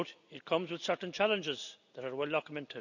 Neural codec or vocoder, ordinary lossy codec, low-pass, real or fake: none; none; 7.2 kHz; real